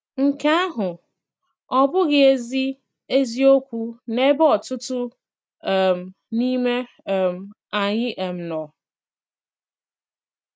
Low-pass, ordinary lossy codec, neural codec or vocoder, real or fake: none; none; none; real